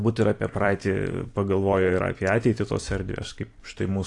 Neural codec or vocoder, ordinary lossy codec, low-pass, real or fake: vocoder, 44.1 kHz, 128 mel bands every 256 samples, BigVGAN v2; AAC, 48 kbps; 10.8 kHz; fake